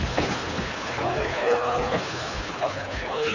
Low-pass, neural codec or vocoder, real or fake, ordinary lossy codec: 7.2 kHz; codec, 24 kHz, 3 kbps, HILCodec; fake; none